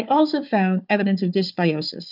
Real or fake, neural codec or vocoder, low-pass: fake; codec, 16 kHz, 4 kbps, FunCodec, trained on Chinese and English, 50 frames a second; 5.4 kHz